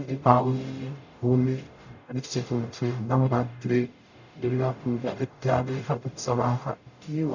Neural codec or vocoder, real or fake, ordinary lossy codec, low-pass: codec, 44.1 kHz, 0.9 kbps, DAC; fake; none; 7.2 kHz